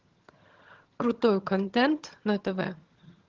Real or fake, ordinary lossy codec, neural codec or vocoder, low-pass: fake; Opus, 16 kbps; vocoder, 22.05 kHz, 80 mel bands, HiFi-GAN; 7.2 kHz